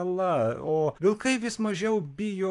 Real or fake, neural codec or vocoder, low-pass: fake; vocoder, 44.1 kHz, 128 mel bands, Pupu-Vocoder; 10.8 kHz